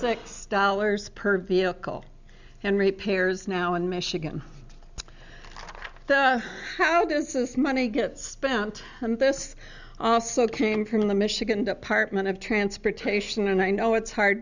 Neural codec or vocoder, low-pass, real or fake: none; 7.2 kHz; real